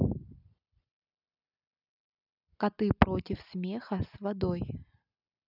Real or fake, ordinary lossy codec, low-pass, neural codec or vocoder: real; none; 5.4 kHz; none